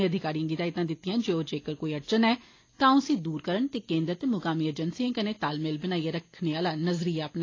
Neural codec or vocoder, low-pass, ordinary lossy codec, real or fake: none; 7.2 kHz; AAC, 32 kbps; real